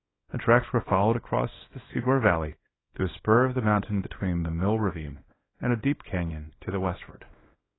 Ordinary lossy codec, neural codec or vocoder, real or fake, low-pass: AAC, 16 kbps; codec, 24 kHz, 0.9 kbps, WavTokenizer, small release; fake; 7.2 kHz